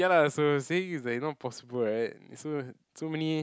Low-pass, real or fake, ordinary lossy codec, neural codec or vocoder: none; real; none; none